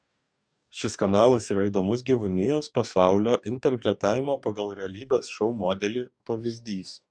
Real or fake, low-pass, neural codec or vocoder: fake; 9.9 kHz; codec, 44.1 kHz, 2.6 kbps, DAC